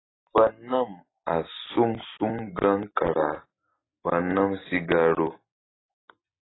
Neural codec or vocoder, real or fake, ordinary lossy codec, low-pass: none; real; AAC, 16 kbps; 7.2 kHz